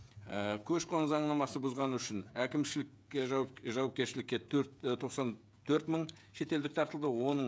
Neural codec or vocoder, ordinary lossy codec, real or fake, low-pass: codec, 16 kHz, 16 kbps, FreqCodec, smaller model; none; fake; none